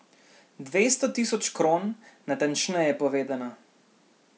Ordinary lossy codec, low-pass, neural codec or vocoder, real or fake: none; none; none; real